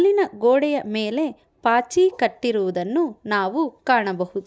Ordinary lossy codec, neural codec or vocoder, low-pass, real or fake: none; none; none; real